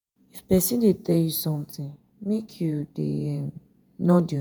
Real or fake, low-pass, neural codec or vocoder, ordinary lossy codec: fake; none; vocoder, 48 kHz, 128 mel bands, Vocos; none